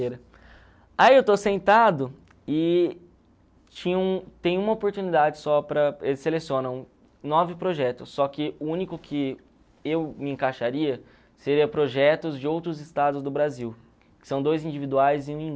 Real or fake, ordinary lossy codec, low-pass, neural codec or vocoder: real; none; none; none